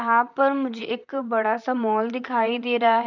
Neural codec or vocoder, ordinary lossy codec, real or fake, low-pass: vocoder, 44.1 kHz, 128 mel bands, Pupu-Vocoder; none; fake; 7.2 kHz